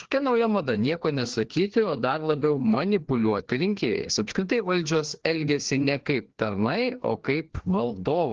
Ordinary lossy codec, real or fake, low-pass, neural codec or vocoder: Opus, 16 kbps; fake; 7.2 kHz; codec, 16 kHz, 2 kbps, FreqCodec, larger model